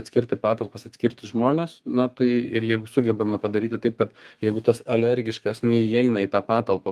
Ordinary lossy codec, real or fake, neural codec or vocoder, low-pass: Opus, 32 kbps; fake; codec, 32 kHz, 1.9 kbps, SNAC; 14.4 kHz